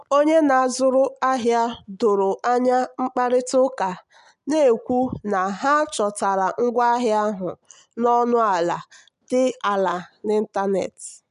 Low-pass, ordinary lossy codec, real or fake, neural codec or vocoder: 10.8 kHz; none; real; none